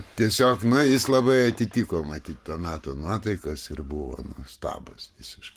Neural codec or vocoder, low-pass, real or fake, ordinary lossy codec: codec, 44.1 kHz, 7.8 kbps, Pupu-Codec; 14.4 kHz; fake; Opus, 24 kbps